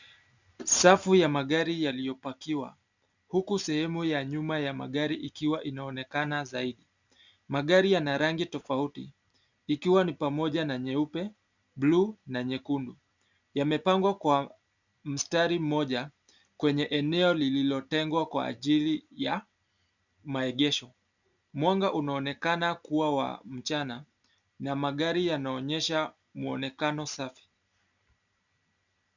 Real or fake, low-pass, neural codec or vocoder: real; 7.2 kHz; none